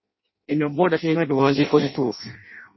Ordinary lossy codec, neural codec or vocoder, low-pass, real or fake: MP3, 24 kbps; codec, 16 kHz in and 24 kHz out, 0.6 kbps, FireRedTTS-2 codec; 7.2 kHz; fake